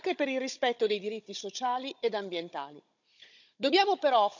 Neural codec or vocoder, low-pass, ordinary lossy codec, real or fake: codec, 16 kHz, 16 kbps, FunCodec, trained on Chinese and English, 50 frames a second; 7.2 kHz; none; fake